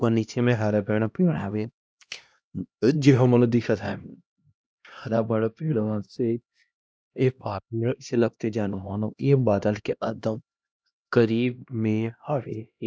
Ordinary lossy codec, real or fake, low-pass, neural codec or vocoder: none; fake; none; codec, 16 kHz, 1 kbps, X-Codec, HuBERT features, trained on LibriSpeech